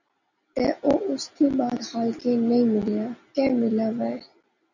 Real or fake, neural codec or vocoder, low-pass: real; none; 7.2 kHz